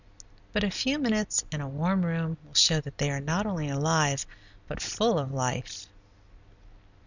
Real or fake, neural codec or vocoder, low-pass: real; none; 7.2 kHz